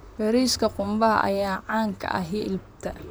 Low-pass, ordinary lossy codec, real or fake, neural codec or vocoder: none; none; fake; vocoder, 44.1 kHz, 128 mel bands, Pupu-Vocoder